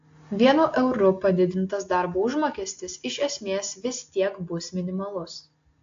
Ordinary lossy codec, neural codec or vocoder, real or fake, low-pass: AAC, 48 kbps; none; real; 7.2 kHz